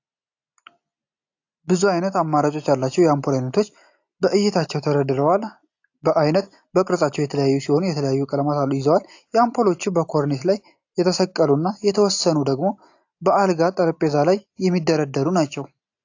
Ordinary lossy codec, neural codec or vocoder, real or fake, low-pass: AAC, 48 kbps; none; real; 7.2 kHz